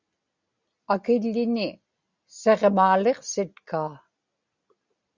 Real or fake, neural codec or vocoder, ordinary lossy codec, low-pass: real; none; Opus, 64 kbps; 7.2 kHz